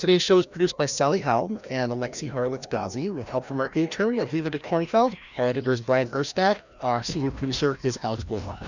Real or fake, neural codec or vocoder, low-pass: fake; codec, 16 kHz, 1 kbps, FreqCodec, larger model; 7.2 kHz